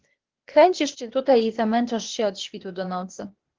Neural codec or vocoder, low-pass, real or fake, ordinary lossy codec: codec, 16 kHz, 0.8 kbps, ZipCodec; 7.2 kHz; fake; Opus, 16 kbps